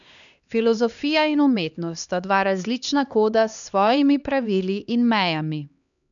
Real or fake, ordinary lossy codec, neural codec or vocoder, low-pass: fake; none; codec, 16 kHz, 2 kbps, X-Codec, HuBERT features, trained on LibriSpeech; 7.2 kHz